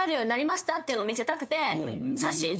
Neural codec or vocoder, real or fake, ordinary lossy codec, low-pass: codec, 16 kHz, 2 kbps, FunCodec, trained on LibriTTS, 25 frames a second; fake; none; none